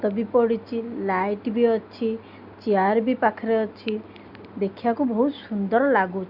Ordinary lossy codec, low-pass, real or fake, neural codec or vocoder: Opus, 64 kbps; 5.4 kHz; real; none